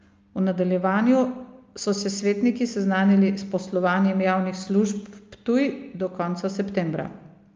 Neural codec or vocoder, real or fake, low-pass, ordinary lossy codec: none; real; 7.2 kHz; Opus, 24 kbps